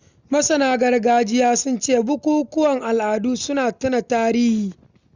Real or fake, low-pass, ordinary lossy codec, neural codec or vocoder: real; 7.2 kHz; Opus, 64 kbps; none